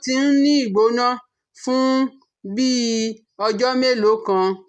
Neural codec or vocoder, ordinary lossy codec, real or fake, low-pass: none; none; real; none